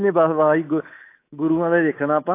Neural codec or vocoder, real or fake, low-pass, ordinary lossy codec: codec, 24 kHz, 3.1 kbps, DualCodec; fake; 3.6 kHz; AAC, 24 kbps